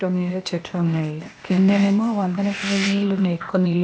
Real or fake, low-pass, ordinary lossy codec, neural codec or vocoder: fake; none; none; codec, 16 kHz, 0.8 kbps, ZipCodec